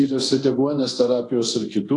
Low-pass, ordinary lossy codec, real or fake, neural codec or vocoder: 10.8 kHz; AAC, 48 kbps; fake; codec, 24 kHz, 0.9 kbps, DualCodec